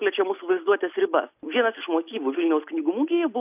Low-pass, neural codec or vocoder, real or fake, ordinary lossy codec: 3.6 kHz; none; real; AAC, 32 kbps